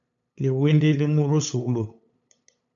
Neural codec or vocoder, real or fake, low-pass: codec, 16 kHz, 8 kbps, FunCodec, trained on LibriTTS, 25 frames a second; fake; 7.2 kHz